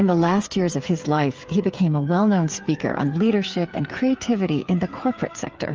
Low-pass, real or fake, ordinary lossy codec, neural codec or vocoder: 7.2 kHz; fake; Opus, 24 kbps; codec, 16 kHz, 8 kbps, FreqCodec, smaller model